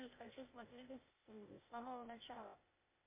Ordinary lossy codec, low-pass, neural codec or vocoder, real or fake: MP3, 24 kbps; 3.6 kHz; codec, 16 kHz, 0.8 kbps, ZipCodec; fake